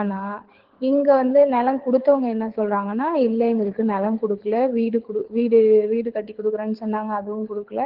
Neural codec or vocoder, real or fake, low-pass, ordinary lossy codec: codec, 24 kHz, 6 kbps, HILCodec; fake; 5.4 kHz; Opus, 16 kbps